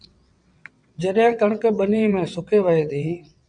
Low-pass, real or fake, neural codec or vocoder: 9.9 kHz; fake; vocoder, 22.05 kHz, 80 mel bands, WaveNeXt